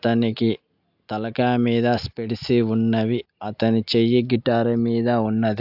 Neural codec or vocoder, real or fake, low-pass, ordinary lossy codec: none; real; 5.4 kHz; AAC, 48 kbps